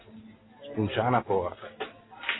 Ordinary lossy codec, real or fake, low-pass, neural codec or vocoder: AAC, 16 kbps; real; 7.2 kHz; none